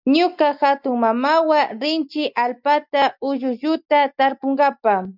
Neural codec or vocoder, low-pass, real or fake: none; 5.4 kHz; real